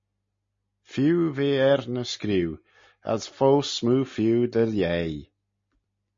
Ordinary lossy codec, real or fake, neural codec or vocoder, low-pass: MP3, 32 kbps; real; none; 7.2 kHz